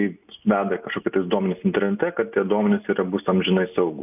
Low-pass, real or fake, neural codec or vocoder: 3.6 kHz; real; none